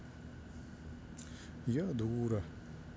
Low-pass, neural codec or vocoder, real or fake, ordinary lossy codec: none; none; real; none